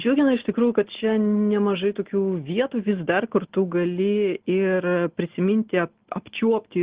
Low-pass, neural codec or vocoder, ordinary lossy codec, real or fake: 3.6 kHz; none; Opus, 16 kbps; real